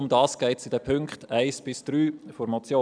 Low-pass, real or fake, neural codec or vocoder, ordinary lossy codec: 9.9 kHz; real; none; none